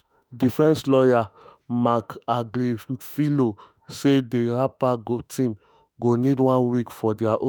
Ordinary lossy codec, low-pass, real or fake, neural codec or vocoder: none; none; fake; autoencoder, 48 kHz, 32 numbers a frame, DAC-VAE, trained on Japanese speech